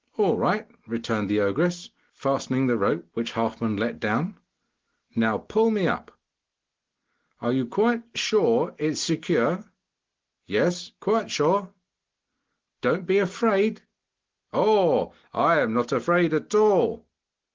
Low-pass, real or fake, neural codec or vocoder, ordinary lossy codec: 7.2 kHz; real; none; Opus, 16 kbps